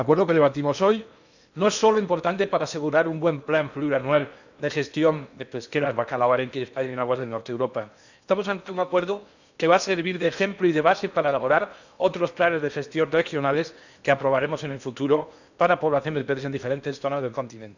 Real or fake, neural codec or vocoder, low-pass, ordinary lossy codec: fake; codec, 16 kHz in and 24 kHz out, 0.8 kbps, FocalCodec, streaming, 65536 codes; 7.2 kHz; none